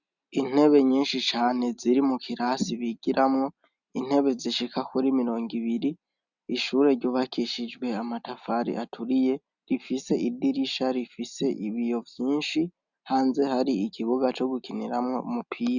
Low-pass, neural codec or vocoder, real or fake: 7.2 kHz; none; real